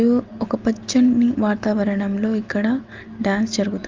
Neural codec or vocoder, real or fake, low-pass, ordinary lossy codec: none; real; 7.2 kHz; Opus, 24 kbps